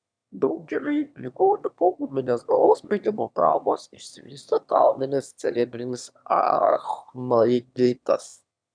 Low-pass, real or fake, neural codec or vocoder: 9.9 kHz; fake; autoencoder, 22.05 kHz, a latent of 192 numbers a frame, VITS, trained on one speaker